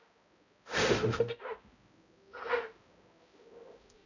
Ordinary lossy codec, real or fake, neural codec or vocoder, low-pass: none; fake; codec, 16 kHz, 0.5 kbps, X-Codec, HuBERT features, trained on balanced general audio; 7.2 kHz